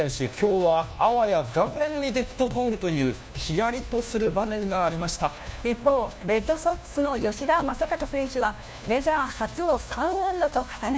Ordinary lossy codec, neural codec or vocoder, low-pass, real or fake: none; codec, 16 kHz, 1 kbps, FunCodec, trained on LibriTTS, 50 frames a second; none; fake